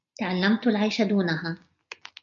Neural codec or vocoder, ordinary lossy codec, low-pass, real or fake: none; MP3, 64 kbps; 7.2 kHz; real